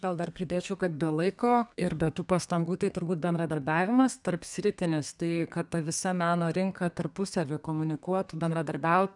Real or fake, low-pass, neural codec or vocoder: fake; 10.8 kHz; codec, 32 kHz, 1.9 kbps, SNAC